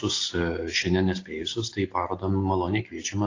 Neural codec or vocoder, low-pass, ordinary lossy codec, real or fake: none; 7.2 kHz; AAC, 32 kbps; real